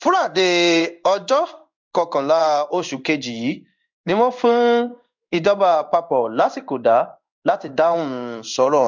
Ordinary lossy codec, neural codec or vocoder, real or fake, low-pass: MP3, 64 kbps; codec, 16 kHz in and 24 kHz out, 1 kbps, XY-Tokenizer; fake; 7.2 kHz